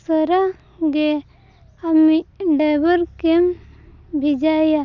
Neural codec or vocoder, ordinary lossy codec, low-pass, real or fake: none; none; 7.2 kHz; real